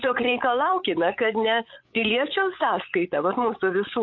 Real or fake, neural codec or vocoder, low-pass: fake; codec, 16 kHz, 8 kbps, FreqCodec, larger model; 7.2 kHz